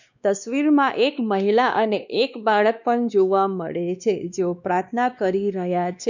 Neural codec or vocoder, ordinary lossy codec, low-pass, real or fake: codec, 16 kHz, 2 kbps, X-Codec, WavLM features, trained on Multilingual LibriSpeech; none; 7.2 kHz; fake